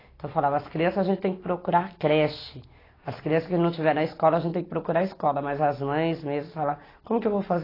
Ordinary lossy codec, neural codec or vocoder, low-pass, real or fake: AAC, 24 kbps; none; 5.4 kHz; real